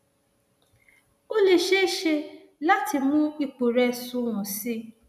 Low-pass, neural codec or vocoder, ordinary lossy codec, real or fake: 14.4 kHz; none; none; real